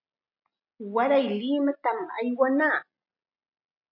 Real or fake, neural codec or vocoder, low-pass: real; none; 5.4 kHz